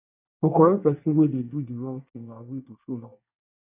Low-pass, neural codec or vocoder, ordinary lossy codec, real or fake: 3.6 kHz; codec, 24 kHz, 1 kbps, SNAC; none; fake